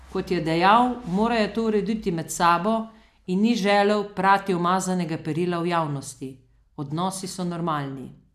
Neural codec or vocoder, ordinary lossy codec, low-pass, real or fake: none; none; 14.4 kHz; real